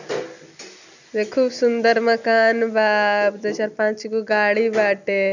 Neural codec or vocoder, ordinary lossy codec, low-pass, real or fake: none; none; 7.2 kHz; real